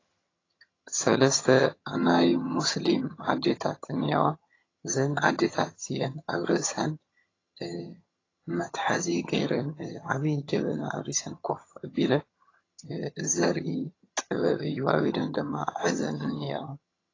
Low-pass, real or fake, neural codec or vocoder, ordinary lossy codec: 7.2 kHz; fake; vocoder, 22.05 kHz, 80 mel bands, HiFi-GAN; AAC, 32 kbps